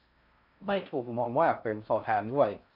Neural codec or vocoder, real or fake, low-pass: codec, 16 kHz in and 24 kHz out, 0.6 kbps, FocalCodec, streaming, 4096 codes; fake; 5.4 kHz